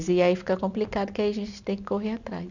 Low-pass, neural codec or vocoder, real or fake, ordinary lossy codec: 7.2 kHz; none; real; none